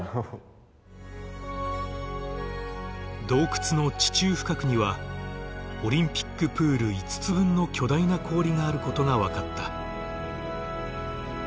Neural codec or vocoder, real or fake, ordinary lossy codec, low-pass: none; real; none; none